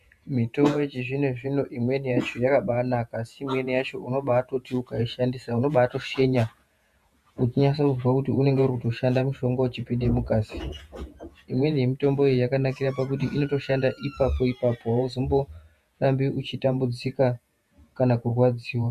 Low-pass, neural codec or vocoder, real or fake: 14.4 kHz; vocoder, 48 kHz, 128 mel bands, Vocos; fake